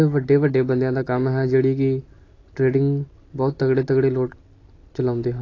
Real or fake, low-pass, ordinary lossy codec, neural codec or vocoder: real; 7.2 kHz; AAC, 32 kbps; none